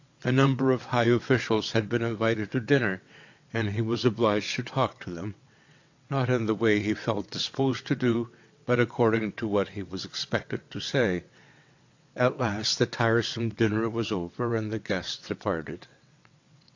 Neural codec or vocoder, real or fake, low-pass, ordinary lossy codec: vocoder, 22.05 kHz, 80 mel bands, WaveNeXt; fake; 7.2 kHz; AAC, 48 kbps